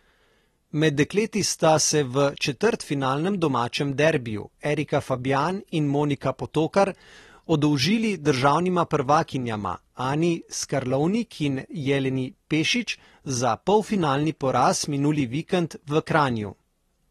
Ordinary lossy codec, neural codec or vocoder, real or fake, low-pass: AAC, 32 kbps; none; real; 19.8 kHz